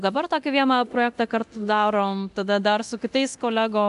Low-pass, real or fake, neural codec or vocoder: 10.8 kHz; fake; codec, 24 kHz, 0.9 kbps, DualCodec